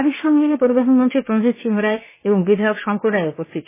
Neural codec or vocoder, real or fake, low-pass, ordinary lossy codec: codec, 16 kHz, about 1 kbps, DyCAST, with the encoder's durations; fake; 3.6 kHz; MP3, 16 kbps